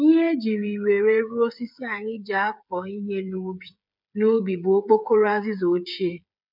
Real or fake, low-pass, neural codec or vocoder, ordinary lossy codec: fake; 5.4 kHz; codec, 16 kHz, 8 kbps, FreqCodec, smaller model; none